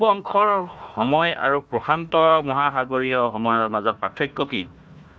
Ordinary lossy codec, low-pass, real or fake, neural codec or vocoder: none; none; fake; codec, 16 kHz, 1 kbps, FunCodec, trained on Chinese and English, 50 frames a second